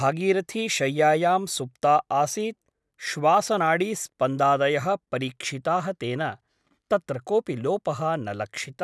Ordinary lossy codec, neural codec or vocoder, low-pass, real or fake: none; none; none; real